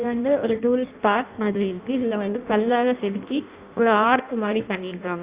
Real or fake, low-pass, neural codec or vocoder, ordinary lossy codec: fake; 3.6 kHz; codec, 16 kHz in and 24 kHz out, 0.6 kbps, FireRedTTS-2 codec; Opus, 64 kbps